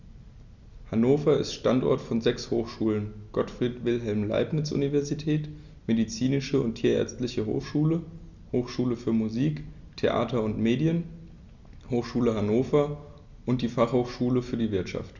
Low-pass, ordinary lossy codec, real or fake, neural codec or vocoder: 7.2 kHz; Opus, 64 kbps; real; none